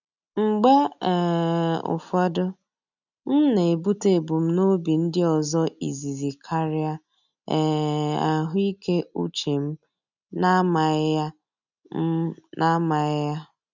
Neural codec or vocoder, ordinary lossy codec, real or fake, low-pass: none; none; real; 7.2 kHz